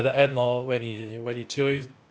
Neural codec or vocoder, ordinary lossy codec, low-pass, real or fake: codec, 16 kHz, 0.8 kbps, ZipCodec; none; none; fake